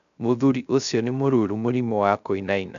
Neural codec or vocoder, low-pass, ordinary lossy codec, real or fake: codec, 16 kHz, 0.3 kbps, FocalCodec; 7.2 kHz; none; fake